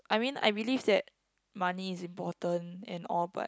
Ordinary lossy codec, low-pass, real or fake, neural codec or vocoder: none; none; real; none